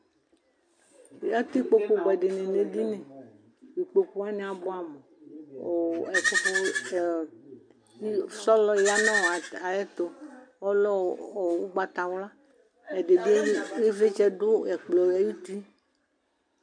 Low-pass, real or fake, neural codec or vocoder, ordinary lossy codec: 9.9 kHz; real; none; MP3, 64 kbps